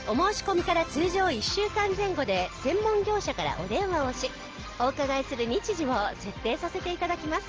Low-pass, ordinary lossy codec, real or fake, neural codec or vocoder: 7.2 kHz; Opus, 16 kbps; real; none